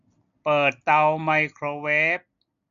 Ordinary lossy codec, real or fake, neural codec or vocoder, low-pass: none; real; none; 7.2 kHz